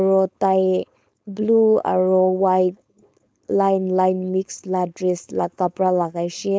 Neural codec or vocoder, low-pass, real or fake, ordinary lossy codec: codec, 16 kHz, 4.8 kbps, FACodec; none; fake; none